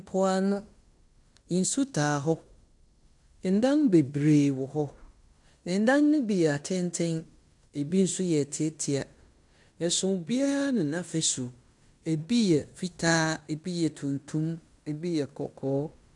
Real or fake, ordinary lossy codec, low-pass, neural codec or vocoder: fake; MP3, 96 kbps; 10.8 kHz; codec, 16 kHz in and 24 kHz out, 0.9 kbps, LongCat-Audio-Codec, fine tuned four codebook decoder